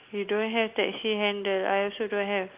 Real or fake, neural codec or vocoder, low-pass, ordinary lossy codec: real; none; 3.6 kHz; Opus, 24 kbps